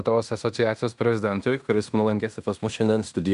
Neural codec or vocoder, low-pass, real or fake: codec, 16 kHz in and 24 kHz out, 0.9 kbps, LongCat-Audio-Codec, fine tuned four codebook decoder; 10.8 kHz; fake